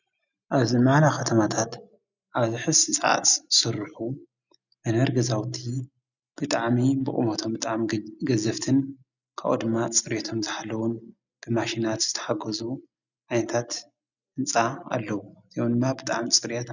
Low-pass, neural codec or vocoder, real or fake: 7.2 kHz; none; real